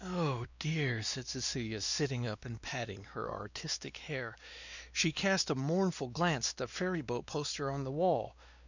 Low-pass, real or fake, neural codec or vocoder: 7.2 kHz; fake; codec, 16 kHz, 2 kbps, X-Codec, WavLM features, trained on Multilingual LibriSpeech